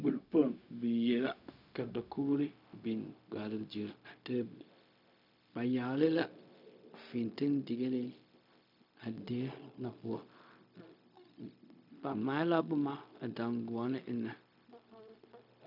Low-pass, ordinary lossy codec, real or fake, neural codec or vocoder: 5.4 kHz; MP3, 48 kbps; fake; codec, 16 kHz, 0.4 kbps, LongCat-Audio-Codec